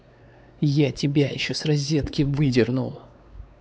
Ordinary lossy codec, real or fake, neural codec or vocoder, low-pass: none; fake; codec, 16 kHz, 4 kbps, X-Codec, WavLM features, trained on Multilingual LibriSpeech; none